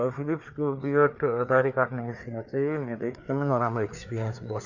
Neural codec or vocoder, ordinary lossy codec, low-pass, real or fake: codec, 16 kHz, 4 kbps, FreqCodec, larger model; none; 7.2 kHz; fake